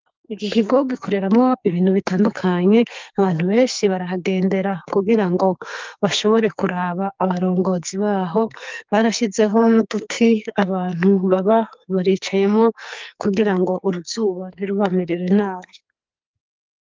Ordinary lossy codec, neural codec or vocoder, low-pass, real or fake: Opus, 32 kbps; codec, 44.1 kHz, 2.6 kbps, SNAC; 7.2 kHz; fake